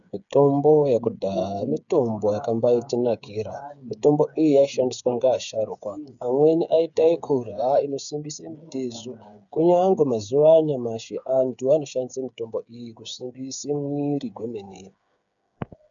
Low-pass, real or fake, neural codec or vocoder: 7.2 kHz; fake; codec, 16 kHz, 8 kbps, FreqCodec, smaller model